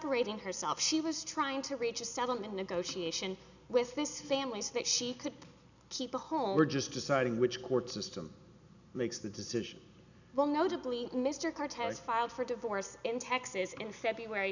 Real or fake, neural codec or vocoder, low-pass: real; none; 7.2 kHz